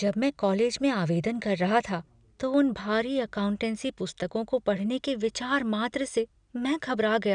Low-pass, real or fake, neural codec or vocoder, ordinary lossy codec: 9.9 kHz; real; none; none